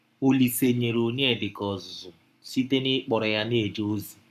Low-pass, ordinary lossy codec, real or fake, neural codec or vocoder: 14.4 kHz; none; fake; codec, 44.1 kHz, 7.8 kbps, Pupu-Codec